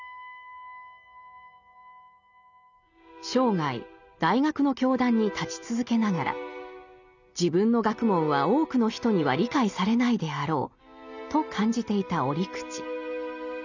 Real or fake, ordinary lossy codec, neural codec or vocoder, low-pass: real; none; none; 7.2 kHz